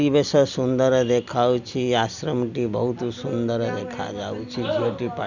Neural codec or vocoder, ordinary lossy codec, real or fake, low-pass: none; none; real; 7.2 kHz